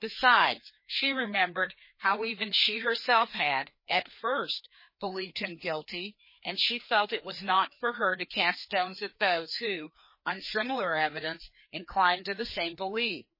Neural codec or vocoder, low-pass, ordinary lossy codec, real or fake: codec, 16 kHz, 2 kbps, FreqCodec, larger model; 5.4 kHz; MP3, 24 kbps; fake